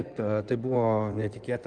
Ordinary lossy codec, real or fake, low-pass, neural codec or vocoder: Opus, 32 kbps; fake; 9.9 kHz; codec, 16 kHz in and 24 kHz out, 2.2 kbps, FireRedTTS-2 codec